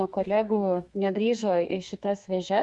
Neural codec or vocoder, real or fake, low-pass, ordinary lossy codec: codec, 32 kHz, 1.9 kbps, SNAC; fake; 10.8 kHz; Opus, 64 kbps